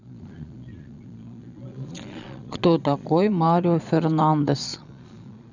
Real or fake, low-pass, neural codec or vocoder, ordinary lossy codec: fake; 7.2 kHz; vocoder, 22.05 kHz, 80 mel bands, Vocos; none